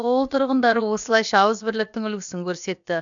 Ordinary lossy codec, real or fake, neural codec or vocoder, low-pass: none; fake; codec, 16 kHz, about 1 kbps, DyCAST, with the encoder's durations; 7.2 kHz